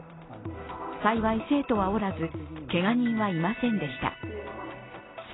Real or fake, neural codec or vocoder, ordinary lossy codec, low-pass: real; none; AAC, 16 kbps; 7.2 kHz